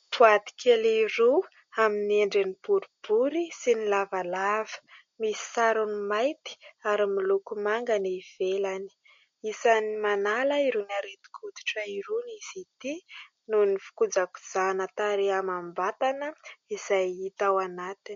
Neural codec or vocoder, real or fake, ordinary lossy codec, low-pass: none; real; MP3, 48 kbps; 7.2 kHz